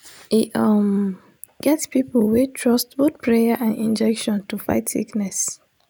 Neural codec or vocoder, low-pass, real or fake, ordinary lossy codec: none; none; real; none